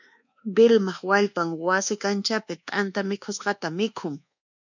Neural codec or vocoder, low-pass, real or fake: codec, 24 kHz, 1.2 kbps, DualCodec; 7.2 kHz; fake